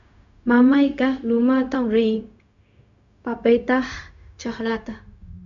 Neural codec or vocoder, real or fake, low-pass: codec, 16 kHz, 0.4 kbps, LongCat-Audio-Codec; fake; 7.2 kHz